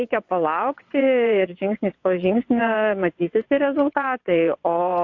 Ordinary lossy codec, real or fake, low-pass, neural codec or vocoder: AAC, 48 kbps; fake; 7.2 kHz; vocoder, 22.05 kHz, 80 mel bands, WaveNeXt